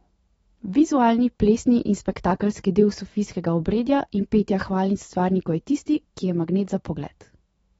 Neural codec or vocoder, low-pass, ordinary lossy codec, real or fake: autoencoder, 48 kHz, 128 numbers a frame, DAC-VAE, trained on Japanese speech; 19.8 kHz; AAC, 24 kbps; fake